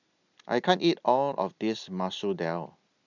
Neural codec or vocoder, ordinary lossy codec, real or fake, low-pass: none; none; real; 7.2 kHz